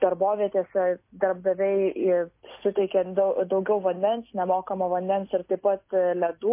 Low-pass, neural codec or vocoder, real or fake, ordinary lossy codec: 3.6 kHz; none; real; MP3, 24 kbps